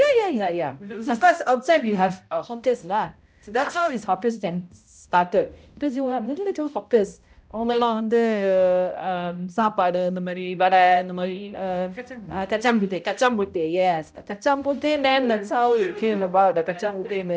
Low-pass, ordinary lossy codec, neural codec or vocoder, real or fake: none; none; codec, 16 kHz, 0.5 kbps, X-Codec, HuBERT features, trained on balanced general audio; fake